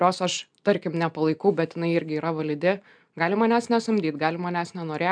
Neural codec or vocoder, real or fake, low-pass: none; real; 9.9 kHz